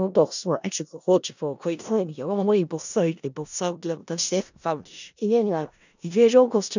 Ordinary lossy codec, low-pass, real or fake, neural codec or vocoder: none; 7.2 kHz; fake; codec, 16 kHz in and 24 kHz out, 0.4 kbps, LongCat-Audio-Codec, four codebook decoder